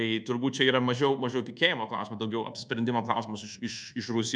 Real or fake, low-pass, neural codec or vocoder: fake; 9.9 kHz; codec, 24 kHz, 1.2 kbps, DualCodec